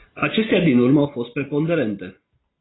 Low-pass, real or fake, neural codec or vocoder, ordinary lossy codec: 7.2 kHz; real; none; AAC, 16 kbps